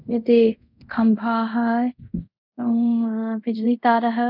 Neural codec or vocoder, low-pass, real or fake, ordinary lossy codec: codec, 24 kHz, 0.5 kbps, DualCodec; 5.4 kHz; fake; none